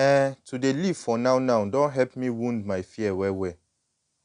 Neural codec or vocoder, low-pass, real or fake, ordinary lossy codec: none; 9.9 kHz; real; none